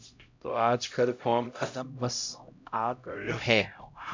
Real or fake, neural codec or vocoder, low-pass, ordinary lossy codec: fake; codec, 16 kHz, 0.5 kbps, X-Codec, HuBERT features, trained on LibriSpeech; 7.2 kHz; MP3, 64 kbps